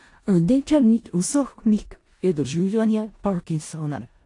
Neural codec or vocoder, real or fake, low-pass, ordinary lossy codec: codec, 16 kHz in and 24 kHz out, 0.4 kbps, LongCat-Audio-Codec, four codebook decoder; fake; 10.8 kHz; AAC, 48 kbps